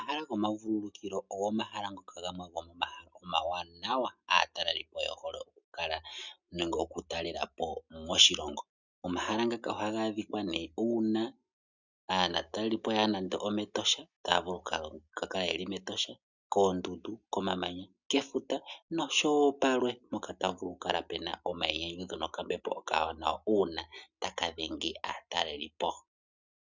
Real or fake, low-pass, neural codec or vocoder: real; 7.2 kHz; none